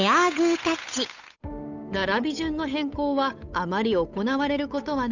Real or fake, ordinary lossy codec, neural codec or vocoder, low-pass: fake; none; codec, 16 kHz, 8 kbps, FunCodec, trained on Chinese and English, 25 frames a second; 7.2 kHz